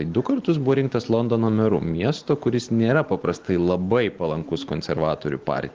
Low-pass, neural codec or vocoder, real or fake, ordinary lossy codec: 7.2 kHz; none; real; Opus, 16 kbps